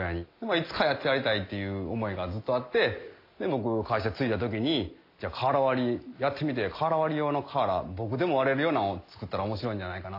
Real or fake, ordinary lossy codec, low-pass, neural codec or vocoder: real; AAC, 32 kbps; 5.4 kHz; none